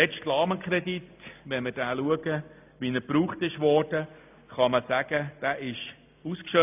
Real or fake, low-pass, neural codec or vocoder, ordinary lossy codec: real; 3.6 kHz; none; AAC, 32 kbps